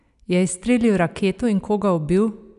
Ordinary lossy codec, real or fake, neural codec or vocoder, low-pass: none; real; none; 10.8 kHz